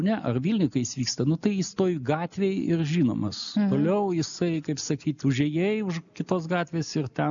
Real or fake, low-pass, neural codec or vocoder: real; 7.2 kHz; none